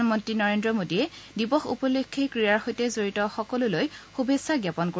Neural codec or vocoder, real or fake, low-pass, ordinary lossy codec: none; real; none; none